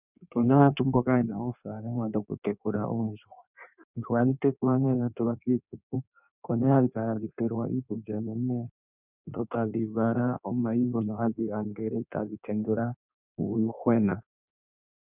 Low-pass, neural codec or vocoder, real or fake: 3.6 kHz; codec, 16 kHz in and 24 kHz out, 1.1 kbps, FireRedTTS-2 codec; fake